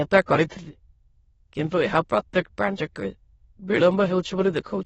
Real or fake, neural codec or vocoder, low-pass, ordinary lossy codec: fake; autoencoder, 22.05 kHz, a latent of 192 numbers a frame, VITS, trained on many speakers; 9.9 kHz; AAC, 24 kbps